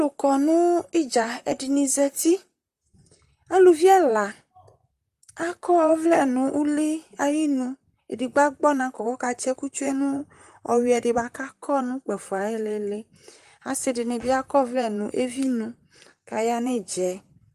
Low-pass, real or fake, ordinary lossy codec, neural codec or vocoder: 14.4 kHz; fake; Opus, 32 kbps; vocoder, 44.1 kHz, 128 mel bands, Pupu-Vocoder